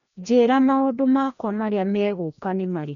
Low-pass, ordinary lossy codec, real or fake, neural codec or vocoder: 7.2 kHz; none; fake; codec, 16 kHz, 1 kbps, FreqCodec, larger model